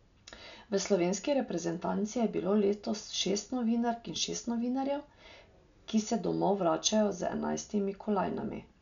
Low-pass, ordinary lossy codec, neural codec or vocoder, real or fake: 7.2 kHz; none; none; real